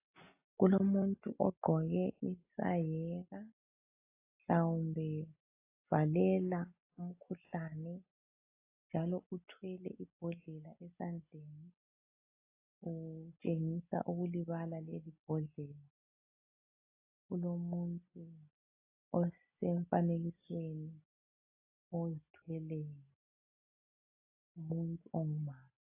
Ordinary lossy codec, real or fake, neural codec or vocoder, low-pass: AAC, 32 kbps; real; none; 3.6 kHz